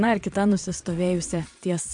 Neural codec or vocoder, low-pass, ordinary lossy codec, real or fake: vocoder, 22.05 kHz, 80 mel bands, Vocos; 9.9 kHz; MP3, 64 kbps; fake